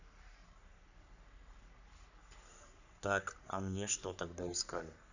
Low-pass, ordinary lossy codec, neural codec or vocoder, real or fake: 7.2 kHz; none; codec, 44.1 kHz, 3.4 kbps, Pupu-Codec; fake